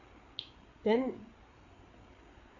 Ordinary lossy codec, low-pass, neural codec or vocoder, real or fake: MP3, 64 kbps; 7.2 kHz; codec, 16 kHz, 8 kbps, FreqCodec, larger model; fake